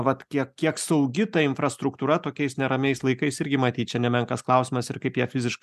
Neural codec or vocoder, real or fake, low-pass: none; real; 14.4 kHz